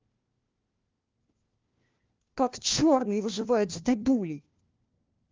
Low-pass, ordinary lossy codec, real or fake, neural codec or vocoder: 7.2 kHz; Opus, 32 kbps; fake; codec, 16 kHz, 1 kbps, FunCodec, trained on LibriTTS, 50 frames a second